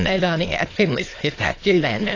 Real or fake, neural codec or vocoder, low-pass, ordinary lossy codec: fake; autoencoder, 22.05 kHz, a latent of 192 numbers a frame, VITS, trained on many speakers; 7.2 kHz; AAC, 32 kbps